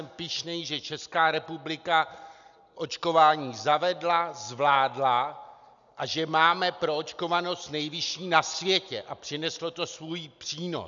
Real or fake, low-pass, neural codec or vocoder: real; 7.2 kHz; none